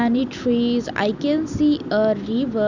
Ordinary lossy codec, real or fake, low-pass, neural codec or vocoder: none; real; 7.2 kHz; none